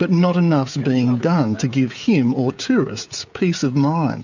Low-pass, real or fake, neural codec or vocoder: 7.2 kHz; fake; codec, 16 kHz, 16 kbps, FreqCodec, smaller model